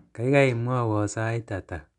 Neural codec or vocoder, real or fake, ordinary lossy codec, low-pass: none; real; none; 10.8 kHz